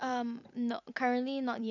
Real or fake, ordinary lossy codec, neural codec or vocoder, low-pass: fake; none; vocoder, 44.1 kHz, 128 mel bands every 256 samples, BigVGAN v2; 7.2 kHz